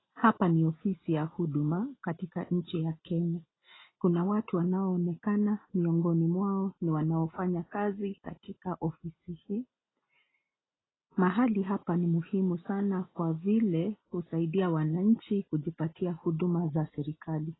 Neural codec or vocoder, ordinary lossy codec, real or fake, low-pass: none; AAC, 16 kbps; real; 7.2 kHz